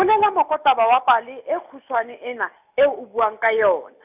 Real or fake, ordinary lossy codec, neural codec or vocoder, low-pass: real; none; none; 3.6 kHz